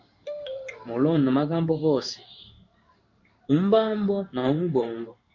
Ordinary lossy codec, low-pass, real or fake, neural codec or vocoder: MP3, 48 kbps; 7.2 kHz; fake; codec, 16 kHz in and 24 kHz out, 1 kbps, XY-Tokenizer